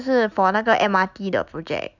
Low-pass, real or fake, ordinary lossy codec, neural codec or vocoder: 7.2 kHz; real; none; none